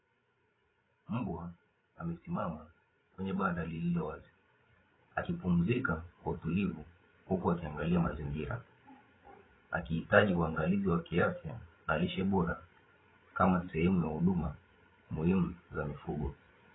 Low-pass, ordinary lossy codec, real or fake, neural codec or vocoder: 7.2 kHz; AAC, 16 kbps; fake; codec, 16 kHz, 16 kbps, FreqCodec, larger model